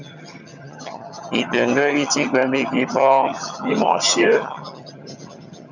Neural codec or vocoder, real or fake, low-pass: vocoder, 22.05 kHz, 80 mel bands, HiFi-GAN; fake; 7.2 kHz